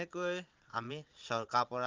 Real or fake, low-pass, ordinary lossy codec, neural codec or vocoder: fake; 7.2 kHz; Opus, 32 kbps; vocoder, 22.05 kHz, 80 mel bands, Vocos